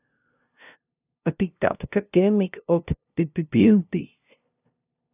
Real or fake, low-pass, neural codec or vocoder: fake; 3.6 kHz; codec, 16 kHz, 0.5 kbps, FunCodec, trained on LibriTTS, 25 frames a second